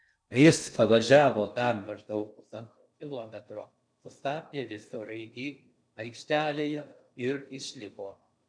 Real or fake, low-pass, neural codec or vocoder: fake; 9.9 kHz; codec, 16 kHz in and 24 kHz out, 0.6 kbps, FocalCodec, streaming, 2048 codes